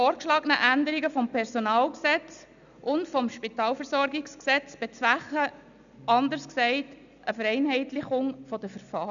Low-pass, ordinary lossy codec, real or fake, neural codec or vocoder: 7.2 kHz; none; real; none